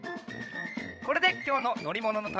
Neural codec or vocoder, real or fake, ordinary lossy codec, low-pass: codec, 16 kHz, 16 kbps, FreqCodec, larger model; fake; none; none